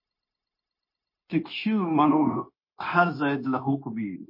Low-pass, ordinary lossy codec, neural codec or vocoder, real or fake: 5.4 kHz; MP3, 24 kbps; codec, 16 kHz, 0.9 kbps, LongCat-Audio-Codec; fake